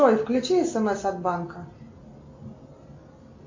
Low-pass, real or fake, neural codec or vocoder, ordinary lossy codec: 7.2 kHz; real; none; MP3, 64 kbps